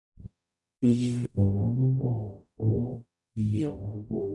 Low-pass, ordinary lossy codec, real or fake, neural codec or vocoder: 10.8 kHz; none; fake; codec, 44.1 kHz, 0.9 kbps, DAC